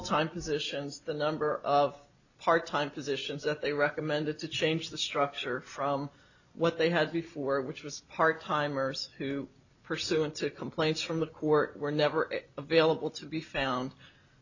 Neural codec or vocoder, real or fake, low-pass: none; real; 7.2 kHz